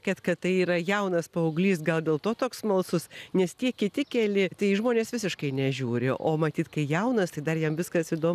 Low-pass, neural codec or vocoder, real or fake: 14.4 kHz; none; real